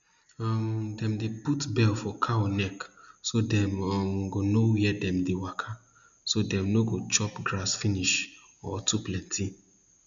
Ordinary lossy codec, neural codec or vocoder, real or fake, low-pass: none; none; real; 7.2 kHz